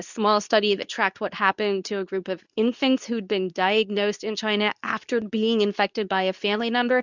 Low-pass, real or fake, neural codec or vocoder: 7.2 kHz; fake; codec, 24 kHz, 0.9 kbps, WavTokenizer, medium speech release version 2